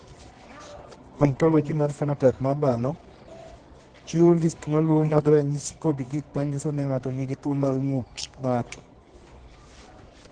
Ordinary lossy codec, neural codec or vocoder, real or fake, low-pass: Opus, 16 kbps; codec, 24 kHz, 0.9 kbps, WavTokenizer, medium music audio release; fake; 9.9 kHz